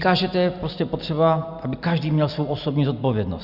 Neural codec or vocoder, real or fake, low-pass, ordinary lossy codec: none; real; 5.4 kHz; Opus, 64 kbps